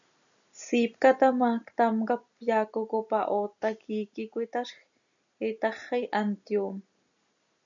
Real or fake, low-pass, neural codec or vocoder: real; 7.2 kHz; none